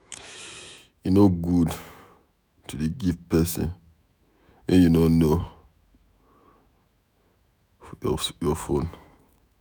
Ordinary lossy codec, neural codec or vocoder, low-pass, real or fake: none; autoencoder, 48 kHz, 128 numbers a frame, DAC-VAE, trained on Japanese speech; none; fake